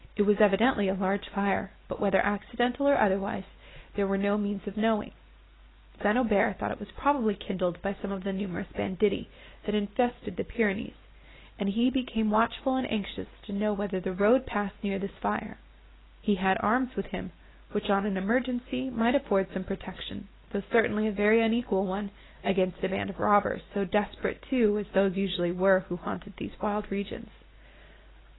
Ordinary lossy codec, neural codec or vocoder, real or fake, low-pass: AAC, 16 kbps; none; real; 7.2 kHz